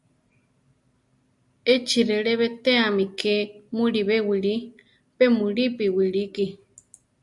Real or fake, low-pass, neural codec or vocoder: real; 10.8 kHz; none